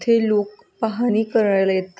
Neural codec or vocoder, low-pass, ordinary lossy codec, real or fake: none; none; none; real